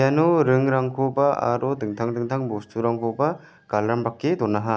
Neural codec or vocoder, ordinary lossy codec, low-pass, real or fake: none; none; none; real